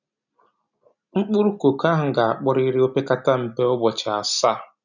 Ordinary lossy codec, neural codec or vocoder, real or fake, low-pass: none; none; real; 7.2 kHz